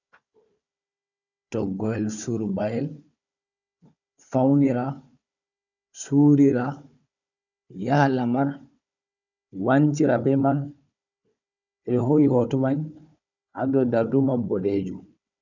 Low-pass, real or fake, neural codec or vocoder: 7.2 kHz; fake; codec, 16 kHz, 4 kbps, FunCodec, trained on Chinese and English, 50 frames a second